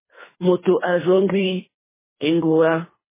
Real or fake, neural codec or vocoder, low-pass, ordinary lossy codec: fake; codec, 24 kHz, 3 kbps, HILCodec; 3.6 kHz; MP3, 16 kbps